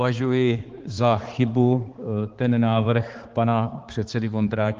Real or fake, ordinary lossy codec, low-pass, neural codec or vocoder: fake; Opus, 16 kbps; 7.2 kHz; codec, 16 kHz, 4 kbps, X-Codec, HuBERT features, trained on balanced general audio